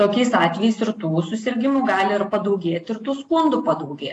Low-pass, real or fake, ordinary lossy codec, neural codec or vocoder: 10.8 kHz; real; AAC, 48 kbps; none